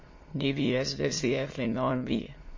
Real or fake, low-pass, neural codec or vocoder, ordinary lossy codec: fake; 7.2 kHz; autoencoder, 22.05 kHz, a latent of 192 numbers a frame, VITS, trained on many speakers; MP3, 32 kbps